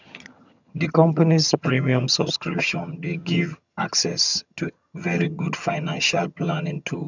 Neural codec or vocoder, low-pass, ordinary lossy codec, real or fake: vocoder, 22.05 kHz, 80 mel bands, HiFi-GAN; 7.2 kHz; none; fake